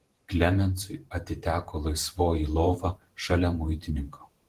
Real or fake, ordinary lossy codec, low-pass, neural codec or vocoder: fake; Opus, 16 kbps; 14.4 kHz; vocoder, 44.1 kHz, 128 mel bands every 512 samples, BigVGAN v2